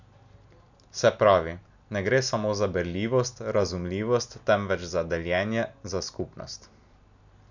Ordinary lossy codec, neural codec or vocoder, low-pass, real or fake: none; none; 7.2 kHz; real